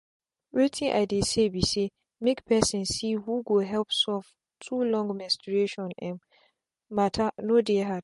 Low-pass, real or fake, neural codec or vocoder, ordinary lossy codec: 14.4 kHz; real; none; MP3, 48 kbps